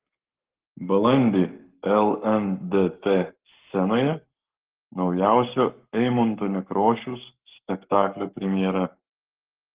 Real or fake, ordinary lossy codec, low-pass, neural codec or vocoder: fake; Opus, 16 kbps; 3.6 kHz; codec, 44.1 kHz, 7.8 kbps, Pupu-Codec